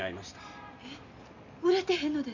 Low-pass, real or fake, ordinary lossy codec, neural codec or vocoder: 7.2 kHz; real; none; none